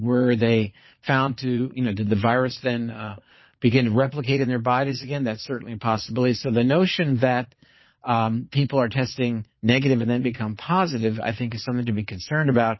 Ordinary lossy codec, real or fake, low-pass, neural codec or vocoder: MP3, 24 kbps; fake; 7.2 kHz; vocoder, 22.05 kHz, 80 mel bands, Vocos